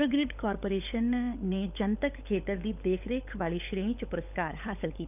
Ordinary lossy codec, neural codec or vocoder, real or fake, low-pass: none; codec, 16 kHz, 16 kbps, FunCodec, trained on LibriTTS, 50 frames a second; fake; 3.6 kHz